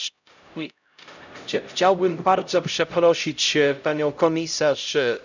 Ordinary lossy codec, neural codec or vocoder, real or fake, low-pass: none; codec, 16 kHz, 0.5 kbps, X-Codec, HuBERT features, trained on LibriSpeech; fake; 7.2 kHz